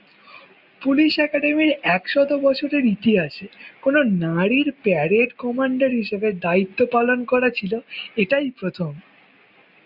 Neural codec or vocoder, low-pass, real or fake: none; 5.4 kHz; real